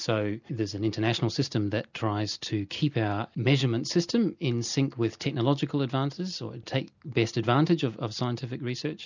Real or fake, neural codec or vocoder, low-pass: real; none; 7.2 kHz